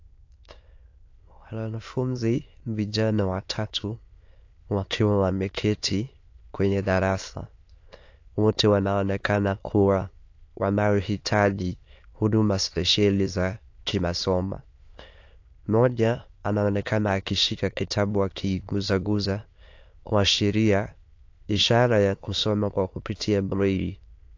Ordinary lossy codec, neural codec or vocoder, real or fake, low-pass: AAC, 48 kbps; autoencoder, 22.05 kHz, a latent of 192 numbers a frame, VITS, trained on many speakers; fake; 7.2 kHz